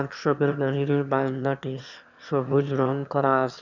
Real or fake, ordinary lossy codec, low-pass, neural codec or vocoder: fake; none; 7.2 kHz; autoencoder, 22.05 kHz, a latent of 192 numbers a frame, VITS, trained on one speaker